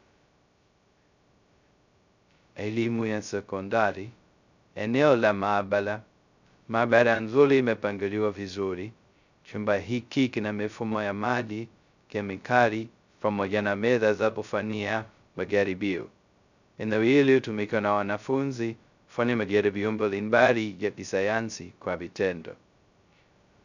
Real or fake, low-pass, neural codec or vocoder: fake; 7.2 kHz; codec, 16 kHz, 0.2 kbps, FocalCodec